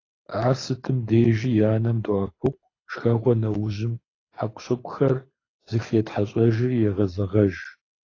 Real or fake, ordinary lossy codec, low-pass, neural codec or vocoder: fake; AAC, 32 kbps; 7.2 kHz; codec, 24 kHz, 6 kbps, HILCodec